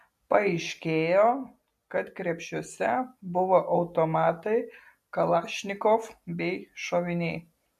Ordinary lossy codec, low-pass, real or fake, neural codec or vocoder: MP3, 64 kbps; 14.4 kHz; real; none